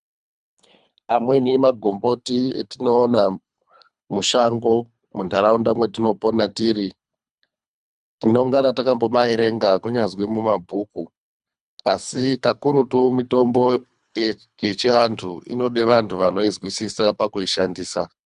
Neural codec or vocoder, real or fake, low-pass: codec, 24 kHz, 3 kbps, HILCodec; fake; 10.8 kHz